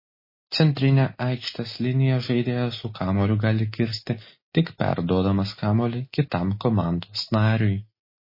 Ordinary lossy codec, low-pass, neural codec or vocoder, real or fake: MP3, 24 kbps; 5.4 kHz; none; real